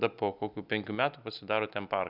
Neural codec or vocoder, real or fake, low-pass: none; real; 5.4 kHz